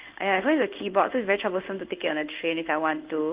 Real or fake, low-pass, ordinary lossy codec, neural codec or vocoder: fake; 3.6 kHz; Opus, 32 kbps; codec, 16 kHz in and 24 kHz out, 1 kbps, XY-Tokenizer